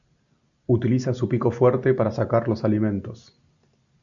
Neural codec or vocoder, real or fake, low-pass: none; real; 7.2 kHz